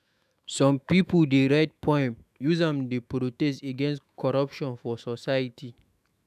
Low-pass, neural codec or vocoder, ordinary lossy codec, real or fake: 14.4 kHz; autoencoder, 48 kHz, 128 numbers a frame, DAC-VAE, trained on Japanese speech; none; fake